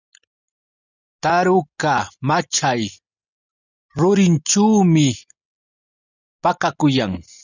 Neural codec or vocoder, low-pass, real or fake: none; 7.2 kHz; real